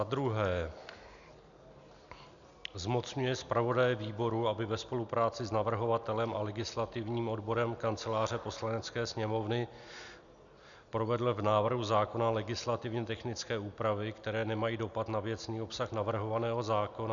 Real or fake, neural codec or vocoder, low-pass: real; none; 7.2 kHz